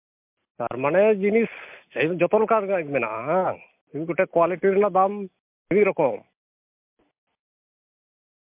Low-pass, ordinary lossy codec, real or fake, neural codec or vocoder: 3.6 kHz; MP3, 32 kbps; real; none